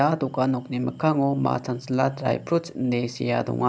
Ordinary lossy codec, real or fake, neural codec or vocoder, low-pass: none; real; none; none